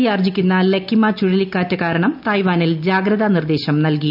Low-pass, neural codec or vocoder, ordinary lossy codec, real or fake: 5.4 kHz; none; none; real